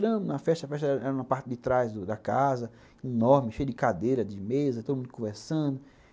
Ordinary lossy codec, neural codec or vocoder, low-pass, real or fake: none; none; none; real